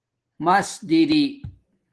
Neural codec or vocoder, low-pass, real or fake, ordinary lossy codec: none; 10.8 kHz; real; Opus, 16 kbps